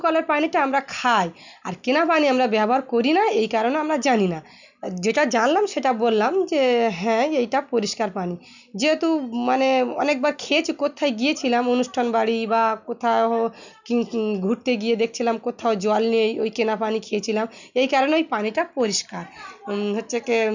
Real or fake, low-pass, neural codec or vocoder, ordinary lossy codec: real; 7.2 kHz; none; none